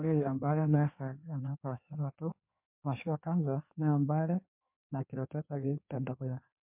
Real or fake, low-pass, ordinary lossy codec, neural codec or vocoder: fake; 3.6 kHz; MP3, 32 kbps; codec, 16 kHz in and 24 kHz out, 1.1 kbps, FireRedTTS-2 codec